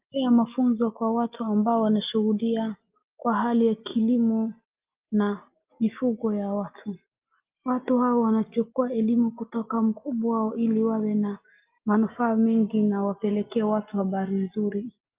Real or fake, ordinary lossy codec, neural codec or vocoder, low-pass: real; Opus, 32 kbps; none; 3.6 kHz